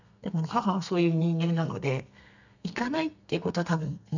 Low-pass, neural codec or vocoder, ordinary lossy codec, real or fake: 7.2 kHz; codec, 32 kHz, 1.9 kbps, SNAC; none; fake